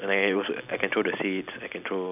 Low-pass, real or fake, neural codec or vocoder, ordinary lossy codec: 3.6 kHz; real; none; none